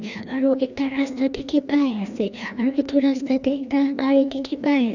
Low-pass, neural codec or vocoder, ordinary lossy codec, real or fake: 7.2 kHz; codec, 16 kHz, 1 kbps, FreqCodec, larger model; none; fake